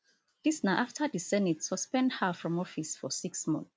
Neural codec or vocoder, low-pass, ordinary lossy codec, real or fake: none; none; none; real